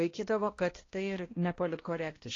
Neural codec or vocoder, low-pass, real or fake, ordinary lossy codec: codec, 16 kHz, 1 kbps, X-Codec, HuBERT features, trained on balanced general audio; 7.2 kHz; fake; AAC, 32 kbps